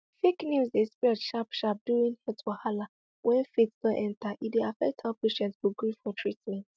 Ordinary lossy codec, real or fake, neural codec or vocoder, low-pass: none; real; none; none